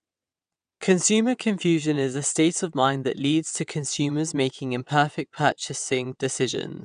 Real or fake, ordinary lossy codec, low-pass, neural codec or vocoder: fake; none; 9.9 kHz; vocoder, 22.05 kHz, 80 mel bands, WaveNeXt